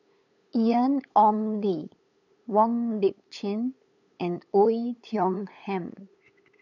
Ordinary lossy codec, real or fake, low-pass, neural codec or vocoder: none; fake; 7.2 kHz; codec, 16 kHz, 8 kbps, FunCodec, trained on LibriTTS, 25 frames a second